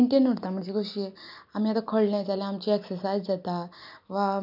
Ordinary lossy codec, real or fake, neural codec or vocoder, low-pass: none; real; none; 5.4 kHz